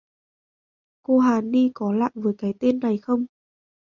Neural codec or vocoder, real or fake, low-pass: none; real; 7.2 kHz